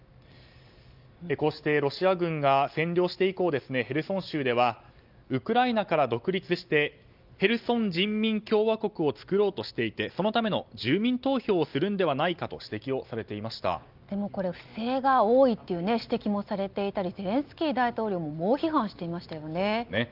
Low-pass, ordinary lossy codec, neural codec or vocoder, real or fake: 5.4 kHz; Opus, 24 kbps; none; real